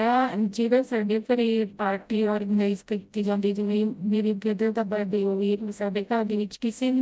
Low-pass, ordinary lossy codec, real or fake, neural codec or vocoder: none; none; fake; codec, 16 kHz, 0.5 kbps, FreqCodec, smaller model